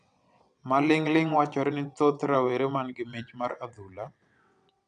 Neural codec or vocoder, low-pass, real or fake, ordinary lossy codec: vocoder, 22.05 kHz, 80 mel bands, WaveNeXt; 9.9 kHz; fake; none